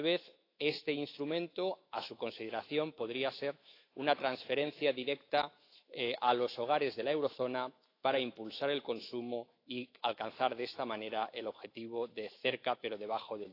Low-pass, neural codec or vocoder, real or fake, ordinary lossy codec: 5.4 kHz; autoencoder, 48 kHz, 128 numbers a frame, DAC-VAE, trained on Japanese speech; fake; AAC, 32 kbps